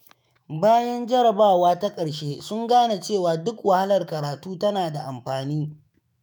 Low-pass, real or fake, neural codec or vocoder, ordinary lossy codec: none; fake; autoencoder, 48 kHz, 128 numbers a frame, DAC-VAE, trained on Japanese speech; none